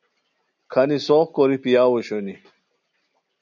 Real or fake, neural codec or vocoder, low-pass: real; none; 7.2 kHz